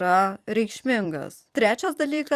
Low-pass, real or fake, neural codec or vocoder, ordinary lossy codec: 14.4 kHz; fake; vocoder, 44.1 kHz, 128 mel bands every 256 samples, BigVGAN v2; Opus, 64 kbps